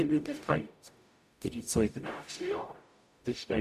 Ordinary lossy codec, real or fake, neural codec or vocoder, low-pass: none; fake; codec, 44.1 kHz, 0.9 kbps, DAC; 14.4 kHz